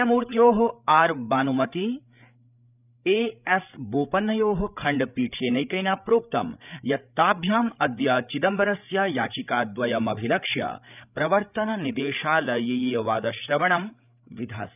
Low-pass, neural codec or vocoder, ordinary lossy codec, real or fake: 3.6 kHz; codec, 16 kHz, 8 kbps, FreqCodec, larger model; none; fake